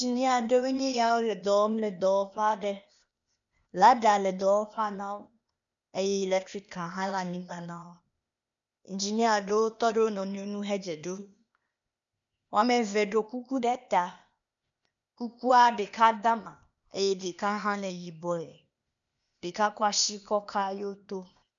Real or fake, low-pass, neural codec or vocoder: fake; 7.2 kHz; codec, 16 kHz, 0.8 kbps, ZipCodec